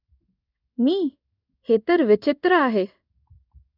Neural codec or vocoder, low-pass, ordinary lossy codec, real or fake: codec, 16 kHz in and 24 kHz out, 1 kbps, XY-Tokenizer; 5.4 kHz; none; fake